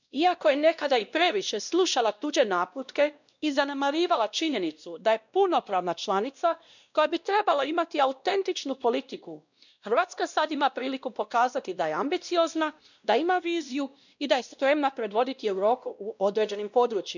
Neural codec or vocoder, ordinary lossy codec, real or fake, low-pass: codec, 16 kHz, 1 kbps, X-Codec, WavLM features, trained on Multilingual LibriSpeech; none; fake; 7.2 kHz